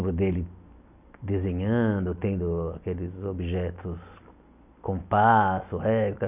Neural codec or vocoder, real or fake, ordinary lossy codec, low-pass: none; real; none; 3.6 kHz